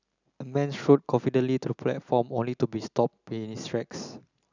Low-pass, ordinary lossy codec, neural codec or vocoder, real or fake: 7.2 kHz; none; none; real